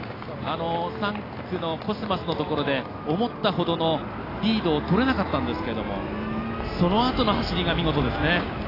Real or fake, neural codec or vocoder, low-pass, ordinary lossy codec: real; none; 5.4 kHz; AAC, 24 kbps